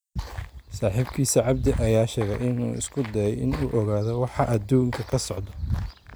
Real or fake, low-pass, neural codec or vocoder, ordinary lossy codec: real; none; none; none